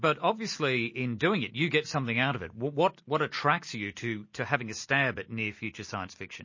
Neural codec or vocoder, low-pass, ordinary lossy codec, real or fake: none; 7.2 kHz; MP3, 32 kbps; real